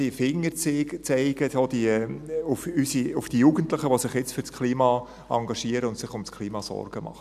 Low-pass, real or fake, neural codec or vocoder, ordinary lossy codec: 14.4 kHz; real; none; none